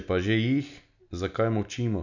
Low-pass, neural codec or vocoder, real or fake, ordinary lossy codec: 7.2 kHz; none; real; none